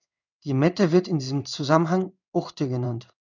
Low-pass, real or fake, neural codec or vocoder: 7.2 kHz; fake; codec, 16 kHz in and 24 kHz out, 1 kbps, XY-Tokenizer